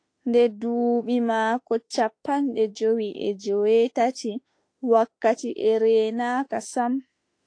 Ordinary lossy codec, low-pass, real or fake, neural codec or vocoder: AAC, 48 kbps; 9.9 kHz; fake; autoencoder, 48 kHz, 32 numbers a frame, DAC-VAE, trained on Japanese speech